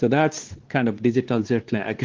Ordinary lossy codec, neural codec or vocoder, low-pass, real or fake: Opus, 16 kbps; codec, 16 kHz, 2 kbps, X-Codec, WavLM features, trained on Multilingual LibriSpeech; 7.2 kHz; fake